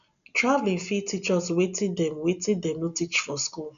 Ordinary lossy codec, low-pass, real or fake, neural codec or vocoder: none; 7.2 kHz; real; none